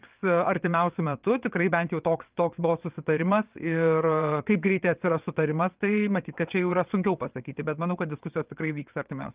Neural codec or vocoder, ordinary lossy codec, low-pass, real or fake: vocoder, 22.05 kHz, 80 mel bands, WaveNeXt; Opus, 32 kbps; 3.6 kHz; fake